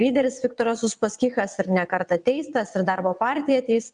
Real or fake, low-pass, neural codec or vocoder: fake; 9.9 kHz; vocoder, 22.05 kHz, 80 mel bands, WaveNeXt